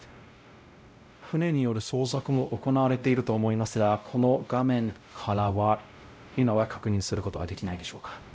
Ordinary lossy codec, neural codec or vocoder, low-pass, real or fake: none; codec, 16 kHz, 0.5 kbps, X-Codec, WavLM features, trained on Multilingual LibriSpeech; none; fake